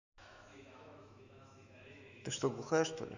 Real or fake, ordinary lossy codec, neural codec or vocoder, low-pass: fake; none; autoencoder, 48 kHz, 128 numbers a frame, DAC-VAE, trained on Japanese speech; 7.2 kHz